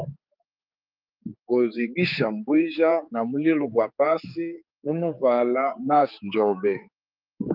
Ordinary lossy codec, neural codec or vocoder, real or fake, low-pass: Opus, 24 kbps; codec, 16 kHz, 4 kbps, X-Codec, HuBERT features, trained on general audio; fake; 5.4 kHz